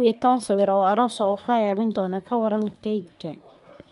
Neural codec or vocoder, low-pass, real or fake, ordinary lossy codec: codec, 24 kHz, 1 kbps, SNAC; 10.8 kHz; fake; none